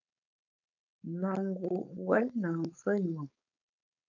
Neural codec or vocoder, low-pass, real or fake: codec, 16 kHz, 4.8 kbps, FACodec; 7.2 kHz; fake